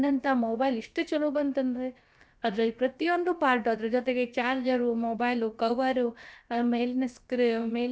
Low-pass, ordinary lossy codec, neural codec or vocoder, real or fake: none; none; codec, 16 kHz, about 1 kbps, DyCAST, with the encoder's durations; fake